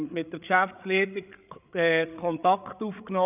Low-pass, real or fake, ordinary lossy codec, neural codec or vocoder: 3.6 kHz; fake; none; codec, 16 kHz, 8 kbps, FreqCodec, larger model